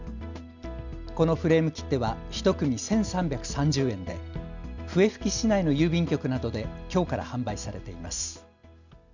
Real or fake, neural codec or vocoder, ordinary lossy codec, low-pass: real; none; none; 7.2 kHz